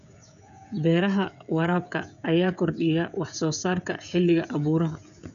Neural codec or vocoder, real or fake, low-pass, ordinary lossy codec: codec, 16 kHz, 16 kbps, FreqCodec, smaller model; fake; 7.2 kHz; none